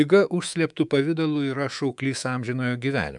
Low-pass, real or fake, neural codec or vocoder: 10.8 kHz; fake; codec, 24 kHz, 3.1 kbps, DualCodec